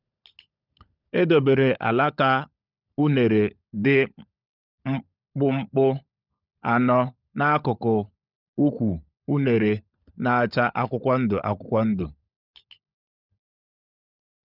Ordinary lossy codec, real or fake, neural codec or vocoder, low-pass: none; fake; codec, 16 kHz, 16 kbps, FunCodec, trained on LibriTTS, 50 frames a second; 5.4 kHz